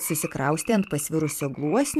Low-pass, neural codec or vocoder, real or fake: 14.4 kHz; vocoder, 44.1 kHz, 128 mel bands every 512 samples, BigVGAN v2; fake